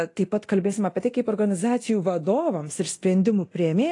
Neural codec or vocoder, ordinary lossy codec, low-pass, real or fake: codec, 24 kHz, 0.9 kbps, DualCodec; AAC, 48 kbps; 10.8 kHz; fake